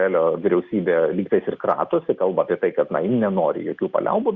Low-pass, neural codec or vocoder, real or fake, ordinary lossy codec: 7.2 kHz; none; real; Opus, 64 kbps